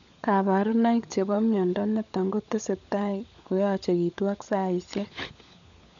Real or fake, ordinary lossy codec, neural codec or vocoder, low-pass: fake; none; codec, 16 kHz, 16 kbps, FunCodec, trained on LibriTTS, 50 frames a second; 7.2 kHz